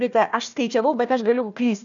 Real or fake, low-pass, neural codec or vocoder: fake; 7.2 kHz; codec, 16 kHz, 1 kbps, FunCodec, trained on Chinese and English, 50 frames a second